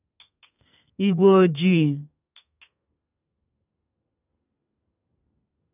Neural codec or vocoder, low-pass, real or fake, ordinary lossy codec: codec, 32 kHz, 1.9 kbps, SNAC; 3.6 kHz; fake; none